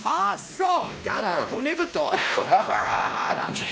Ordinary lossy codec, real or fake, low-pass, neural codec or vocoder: none; fake; none; codec, 16 kHz, 1 kbps, X-Codec, WavLM features, trained on Multilingual LibriSpeech